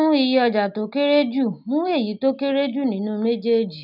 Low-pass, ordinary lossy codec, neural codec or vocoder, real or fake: 5.4 kHz; none; none; real